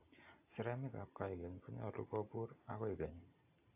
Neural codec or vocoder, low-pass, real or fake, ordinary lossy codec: none; 3.6 kHz; real; AAC, 32 kbps